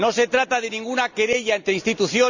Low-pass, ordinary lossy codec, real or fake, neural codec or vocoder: 7.2 kHz; none; real; none